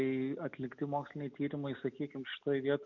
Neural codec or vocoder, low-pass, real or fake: none; 7.2 kHz; real